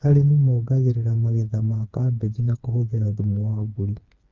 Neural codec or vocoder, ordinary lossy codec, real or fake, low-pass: codec, 16 kHz, 4 kbps, FreqCodec, smaller model; Opus, 24 kbps; fake; 7.2 kHz